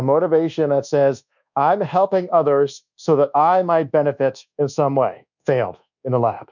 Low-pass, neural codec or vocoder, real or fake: 7.2 kHz; codec, 24 kHz, 1.2 kbps, DualCodec; fake